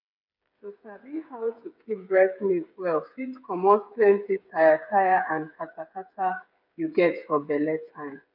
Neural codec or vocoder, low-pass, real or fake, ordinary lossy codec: codec, 16 kHz, 8 kbps, FreqCodec, smaller model; 5.4 kHz; fake; none